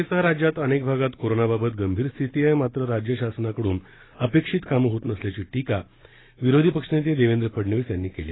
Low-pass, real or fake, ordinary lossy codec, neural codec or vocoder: 7.2 kHz; real; AAC, 16 kbps; none